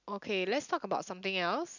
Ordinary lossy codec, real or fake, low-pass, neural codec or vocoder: none; real; 7.2 kHz; none